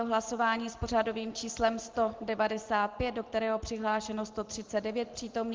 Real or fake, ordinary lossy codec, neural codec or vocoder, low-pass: real; Opus, 16 kbps; none; 7.2 kHz